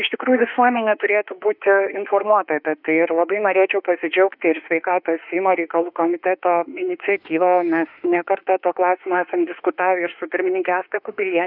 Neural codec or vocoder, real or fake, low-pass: autoencoder, 48 kHz, 32 numbers a frame, DAC-VAE, trained on Japanese speech; fake; 5.4 kHz